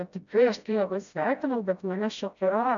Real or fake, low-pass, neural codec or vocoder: fake; 7.2 kHz; codec, 16 kHz, 0.5 kbps, FreqCodec, smaller model